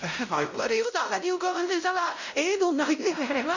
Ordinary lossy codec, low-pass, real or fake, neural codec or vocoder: none; 7.2 kHz; fake; codec, 16 kHz, 0.5 kbps, X-Codec, WavLM features, trained on Multilingual LibriSpeech